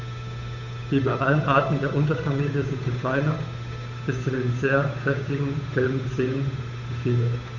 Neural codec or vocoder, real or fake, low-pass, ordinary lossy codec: codec, 16 kHz, 8 kbps, FunCodec, trained on Chinese and English, 25 frames a second; fake; 7.2 kHz; none